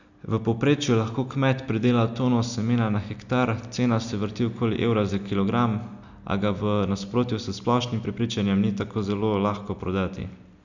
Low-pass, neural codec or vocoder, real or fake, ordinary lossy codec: 7.2 kHz; none; real; none